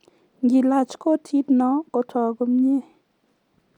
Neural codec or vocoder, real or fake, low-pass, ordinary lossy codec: none; real; 19.8 kHz; none